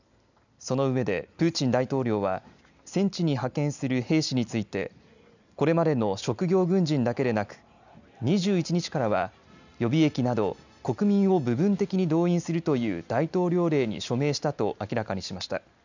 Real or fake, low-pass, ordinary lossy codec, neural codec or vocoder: real; 7.2 kHz; none; none